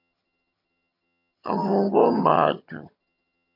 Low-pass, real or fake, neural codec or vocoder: 5.4 kHz; fake; vocoder, 22.05 kHz, 80 mel bands, HiFi-GAN